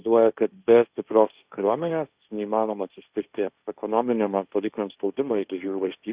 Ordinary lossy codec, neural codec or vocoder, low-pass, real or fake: Opus, 64 kbps; codec, 16 kHz, 1.1 kbps, Voila-Tokenizer; 3.6 kHz; fake